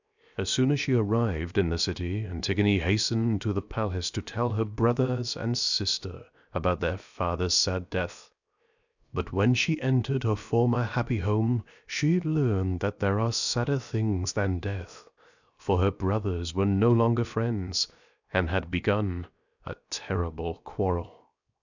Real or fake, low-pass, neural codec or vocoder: fake; 7.2 kHz; codec, 16 kHz, 0.7 kbps, FocalCodec